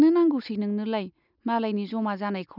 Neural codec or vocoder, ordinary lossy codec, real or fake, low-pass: none; none; real; 5.4 kHz